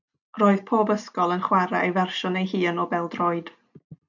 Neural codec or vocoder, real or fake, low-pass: none; real; 7.2 kHz